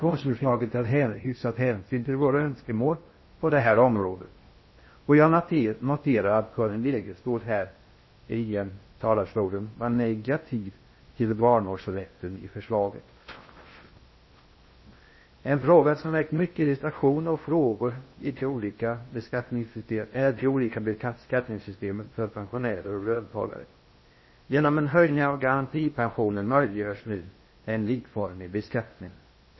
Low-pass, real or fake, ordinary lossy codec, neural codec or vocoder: 7.2 kHz; fake; MP3, 24 kbps; codec, 16 kHz in and 24 kHz out, 0.6 kbps, FocalCodec, streaming, 4096 codes